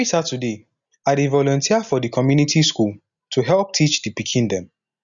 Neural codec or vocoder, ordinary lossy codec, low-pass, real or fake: none; none; 7.2 kHz; real